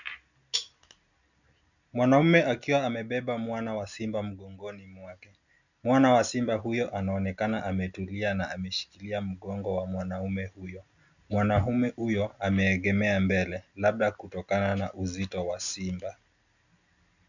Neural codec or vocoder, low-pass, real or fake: none; 7.2 kHz; real